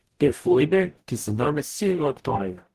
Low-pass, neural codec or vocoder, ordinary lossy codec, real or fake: 14.4 kHz; codec, 44.1 kHz, 0.9 kbps, DAC; Opus, 16 kbps; fake